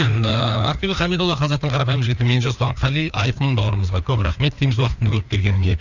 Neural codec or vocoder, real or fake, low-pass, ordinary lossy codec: codec, 16 kHz, 2 kbps, FreqCodec, larger model; fake; 7.2 kHz; none